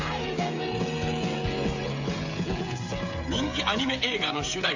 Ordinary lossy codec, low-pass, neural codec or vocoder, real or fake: AAC, 48 kbps; 7.2 kHz; codec, 16 kHz, 16 kbps, FreqCodec, smaller model; fake